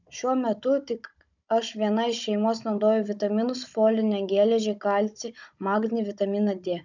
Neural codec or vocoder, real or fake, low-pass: codec, 16 kHz, 16 kbps, FunCodec, trained on Chinese and English, 50 frames a second; fake; 7.2 kHz